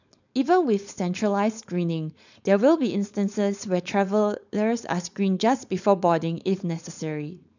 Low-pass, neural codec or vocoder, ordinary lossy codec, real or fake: 7.2 kHz; codec, 16 kHz, 4.8 kbps, FACodec; none; fake